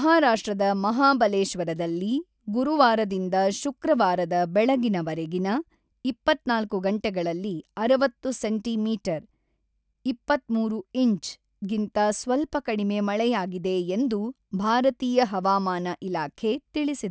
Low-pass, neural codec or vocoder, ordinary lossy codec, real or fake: none; none; none; real